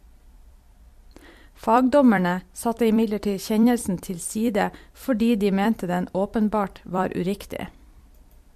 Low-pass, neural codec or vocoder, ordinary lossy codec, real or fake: 14.4 kHz; vocoder, 44.1 kHz, 128 mel bands every 256 samples, BigVGAN v2; MP3, 64 kbps; fake